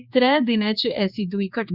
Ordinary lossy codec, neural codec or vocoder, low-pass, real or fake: Opus, 64 kbps; codec, 16 kHz, 4 kbps, X-Codec, HuBERT features, trained on general audio; 5.4 kHz; fake